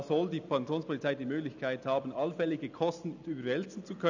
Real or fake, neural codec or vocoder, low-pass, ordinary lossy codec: real; none; 7.2 kHz; none